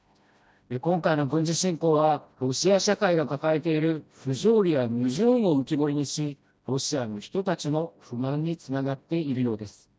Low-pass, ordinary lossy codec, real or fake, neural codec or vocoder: none; none; fake; codec, 16 kHz, 1 kbps, FreqCodec, smaller model